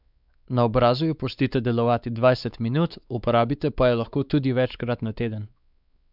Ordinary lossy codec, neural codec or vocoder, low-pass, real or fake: none; codec, 16 kHz, 4 kbps, X-Codec, WavLM features, trained on Multilingual LibriSpeech; 5.4 kHz; fake